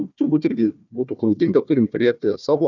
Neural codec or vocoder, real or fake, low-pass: codec, 16 kHz, 1 kbps, FunCodec, trained on Chinese and English, 50 frames a second; fake; 7.2 kHz